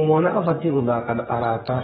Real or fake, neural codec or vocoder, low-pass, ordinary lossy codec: fake; codec, 32 kHz, 1.9 kbps, SNAC; 14.4 kHz; AAC, 16 kbps